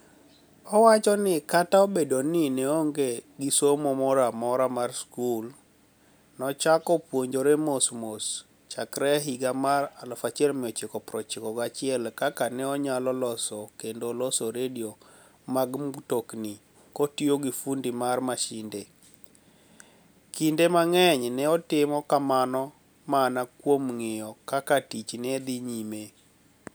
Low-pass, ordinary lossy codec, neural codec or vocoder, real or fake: none; none; none; real